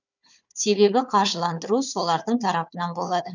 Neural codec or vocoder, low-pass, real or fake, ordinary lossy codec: codec, 16 kHz, 4 kbps, FunCodec, trained on Chinese and English, 50 frames a second; 7.2 kHz; fake; none